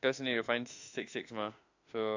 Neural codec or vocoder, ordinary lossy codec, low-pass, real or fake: autoencoder, 48 kHz, 32 numbers a frame, DAC-VAE, trained on Japanese speech; AAC, 48 kbps; 7.2 kHz; fake